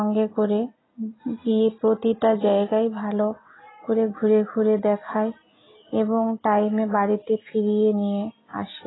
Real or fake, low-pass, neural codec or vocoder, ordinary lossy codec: real; 7.2 kHz; none; AAC, 16 kbps